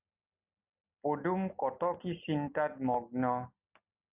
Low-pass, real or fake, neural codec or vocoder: 3.6 kHz; real; none